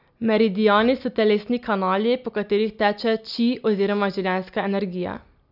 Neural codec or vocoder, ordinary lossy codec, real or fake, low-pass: none; none; real; 5.4 kHz